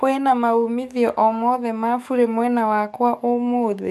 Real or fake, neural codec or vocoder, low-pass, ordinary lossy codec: fake; codec, 44.1 kHz, 7.8 kbps, DAC; 14.4 kHz; none